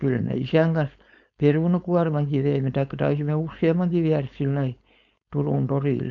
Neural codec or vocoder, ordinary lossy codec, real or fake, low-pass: codec, 16 kHz, 4.8 kbps, FACodec; none; fake; 7.2 kHz